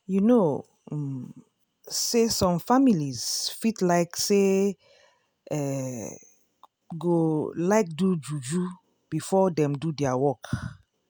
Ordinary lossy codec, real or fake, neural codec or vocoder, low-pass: none; real; none; none